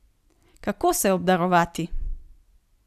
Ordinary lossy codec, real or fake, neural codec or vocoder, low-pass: none; real; none; 14.4 kHz